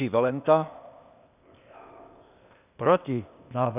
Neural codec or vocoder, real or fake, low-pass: codec, 16 kHz, 0.8 kbps, ZipCodec; fake; 3.6 kHz